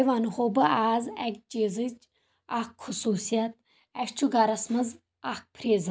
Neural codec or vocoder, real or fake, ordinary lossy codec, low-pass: none; real; none; none